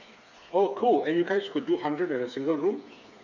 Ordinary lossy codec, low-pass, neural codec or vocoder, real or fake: none; 7.2 kHz; codec, 16 kHz, 4 kbps, FreqCodec, smaller model; fake